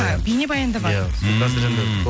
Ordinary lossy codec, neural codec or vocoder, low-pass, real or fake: none; none; none; real